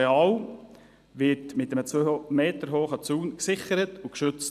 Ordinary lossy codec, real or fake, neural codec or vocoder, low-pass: none; real; none; 14.4 kHz